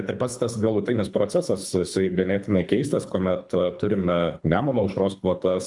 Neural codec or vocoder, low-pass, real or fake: codec, 24 kHz, 3 kbps, HILCodec; 10.8 kHz; fake